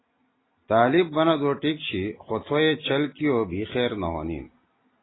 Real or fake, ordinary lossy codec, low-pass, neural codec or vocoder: real; AAC, 16 kbps; 7.2 kHz; none